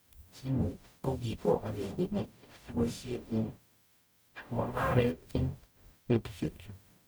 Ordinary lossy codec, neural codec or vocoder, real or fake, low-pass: none; codec, 44.1 kHz, 0.9 kbps, DAC; fake; none